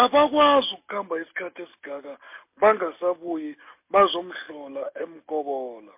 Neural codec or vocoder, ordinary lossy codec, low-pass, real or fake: none; MP3, 24 kbps; 3.6 kHz; real